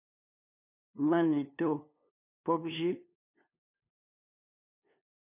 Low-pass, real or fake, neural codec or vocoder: 3.6 kHz; fake; codec, 16 kHz, 2 kbps, FunCodec, trained on LibriTTS, 25 frames a second